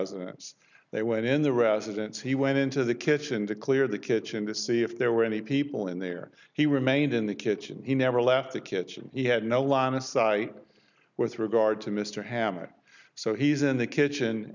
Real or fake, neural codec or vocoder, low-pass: real; none; 7.2 kHz